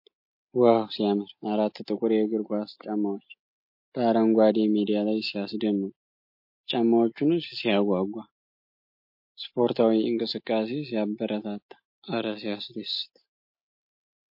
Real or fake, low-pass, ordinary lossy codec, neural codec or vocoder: real; 5.4 kHz; MP3, 32 kbps; none